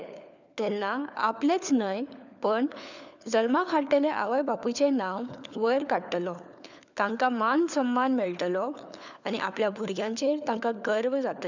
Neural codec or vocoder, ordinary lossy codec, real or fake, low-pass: codec, 16 kHz, 4 kbps, FunCodec, trained on LibriTTS, 50 frames a second; none; fake; 7.2 kHz